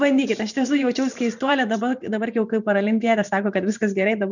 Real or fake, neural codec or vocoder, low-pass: fake; vocoder, 44.1 kHz, 128 mel bands every 512 samples, BigVGAN v2; 7.2 kHz